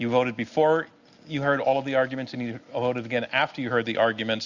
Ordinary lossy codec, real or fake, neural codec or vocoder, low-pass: Opus, 64 kbps; real; none; 7.2 kHz